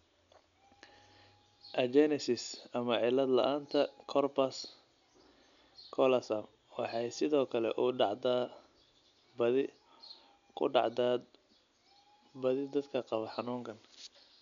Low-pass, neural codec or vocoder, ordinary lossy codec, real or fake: 7.2 kHz; none; none; real